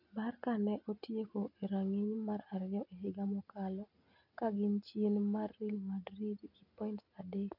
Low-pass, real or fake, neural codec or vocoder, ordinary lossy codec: 5.4 kHz; real; none; none